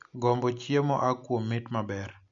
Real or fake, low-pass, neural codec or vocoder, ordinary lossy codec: real; 7.2 kHz; none; MP3, 64 kbps